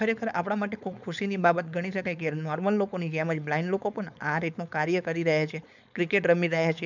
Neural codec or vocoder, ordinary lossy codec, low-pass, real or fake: codec, 16 kHz, 4.8 kbps, FACodec; none; 7.2 kHz; fake